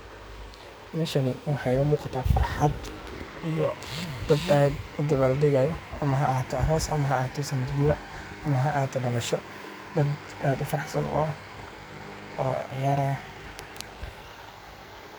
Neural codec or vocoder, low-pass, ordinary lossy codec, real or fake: codec, 44.1 kHz, 2.6 kbps, SNAC; none; none; fake